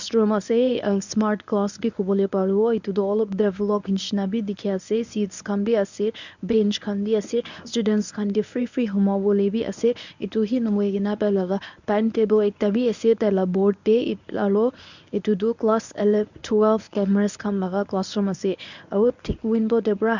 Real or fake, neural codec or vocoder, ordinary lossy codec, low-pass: fake; codec, 24 kHz, 0.9 kbps, WavTokenizer, medium speech release version 1; none; 7.2 kHz